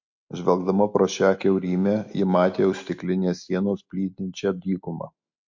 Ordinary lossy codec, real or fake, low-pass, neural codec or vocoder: MP3, 48 kbps; real; 7.2 kHz; none